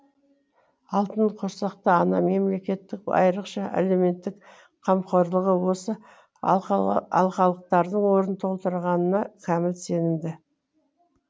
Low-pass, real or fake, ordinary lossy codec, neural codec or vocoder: none; real; none; none